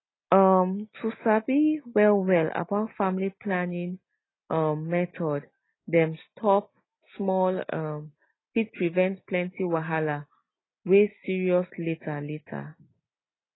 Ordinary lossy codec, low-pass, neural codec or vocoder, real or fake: AAC, 16 kbps; 7.2 kHz; none; real